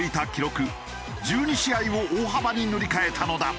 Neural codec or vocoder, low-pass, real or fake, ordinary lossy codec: none; none; real; none